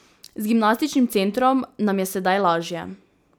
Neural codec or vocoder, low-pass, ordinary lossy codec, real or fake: none; none; none; real